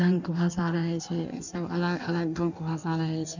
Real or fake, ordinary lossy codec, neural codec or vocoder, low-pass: fake; none; codec, 16 kHz in and 24 kHz out, 1.1 kbps, FireRedTTS-2 codec; 7.2 kHz